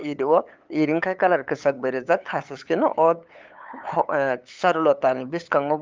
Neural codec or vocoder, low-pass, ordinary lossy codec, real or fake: codec, 16 kHz, 8 kbps, FunCodec, trained on LibriTTS, 25 frames a second; 7.2 kHz; Opus, 24 kbps; fake